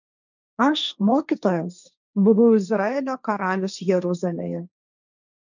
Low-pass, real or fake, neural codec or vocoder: 7.2 kHz; fake; codec, 16 kHz, 1.1 kbps, Voila-Tokenizer